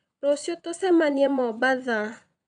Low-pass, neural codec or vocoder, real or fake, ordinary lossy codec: 9.9 kHz; vocoder, 22.05 kHz, 80 mel bands, Vocos; fake; none